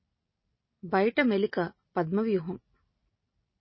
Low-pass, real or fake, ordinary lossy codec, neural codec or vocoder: 7.2 kHz; real; MP3, 24 kbps; none